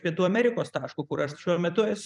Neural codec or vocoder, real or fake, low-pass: vocoder, 44.1 kHz, 128 mel bands every 256 samples, BigVGAN v2; fake; 10.8 kHz